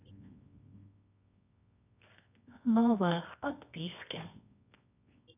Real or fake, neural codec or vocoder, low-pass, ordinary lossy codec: fake; codec, 24 kHz, 0.9 kbps, WavTokenizer, medium music audio release; 3.6 kHz; none